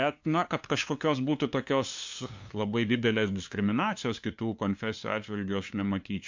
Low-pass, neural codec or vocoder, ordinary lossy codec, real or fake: 7.2 kHz; codec, 16 kHz, 2 kbps, FunCodec, trained on LibriTTS, 25 frames a second; MP3, 64 kbps; fake